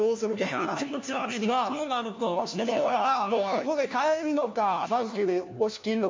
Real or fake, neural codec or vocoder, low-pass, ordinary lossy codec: fake; codec, 16 kHz, 1 kbps, FunCodec, trained on LibriTTS, 50 frames a second; 7.2 kHz; MP3, 64 kbps